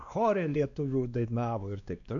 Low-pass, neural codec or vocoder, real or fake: 7.2 kHz; codec, 16 kHz, 2 kbps, X-Codec, WavLM features, trained on Multilingual LibriSpeech; fake